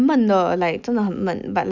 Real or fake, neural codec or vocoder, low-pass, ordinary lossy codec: real; none; 7.2 kHz; none